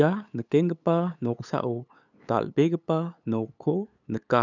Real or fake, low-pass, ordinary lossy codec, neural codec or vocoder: fake; 7.2 kHz; none; codec, 16 kHz, 8 kbps, FunCodec, trained on LibriTTS, 25 frames a second